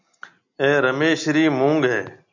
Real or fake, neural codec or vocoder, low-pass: real; none; 7.2 kHz